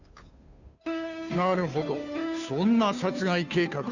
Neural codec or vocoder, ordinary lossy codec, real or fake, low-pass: codec, 16 kHz, 2 kbps, FunCodec, trained on Chinese and English, 25 frames a second; none; fake; 7.2 kHz